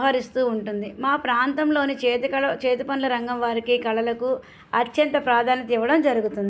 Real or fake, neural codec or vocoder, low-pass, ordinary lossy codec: real; none; none; none